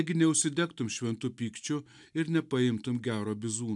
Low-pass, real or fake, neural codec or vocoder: 10.8 kHz; real; none